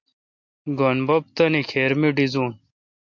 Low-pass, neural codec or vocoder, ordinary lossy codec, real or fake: 7.2 kHz; none; MP3, 48 kbps; real